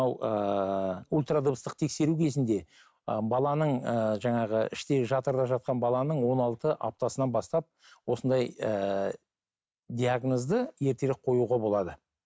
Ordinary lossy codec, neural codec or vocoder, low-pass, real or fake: none; none; none; real